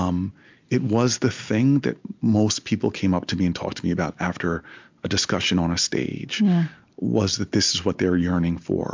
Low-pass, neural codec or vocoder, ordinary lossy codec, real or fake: 7.2 kHz; none; MP3, 64 kbps; real